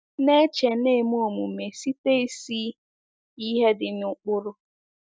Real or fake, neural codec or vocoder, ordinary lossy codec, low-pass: real; none; none; none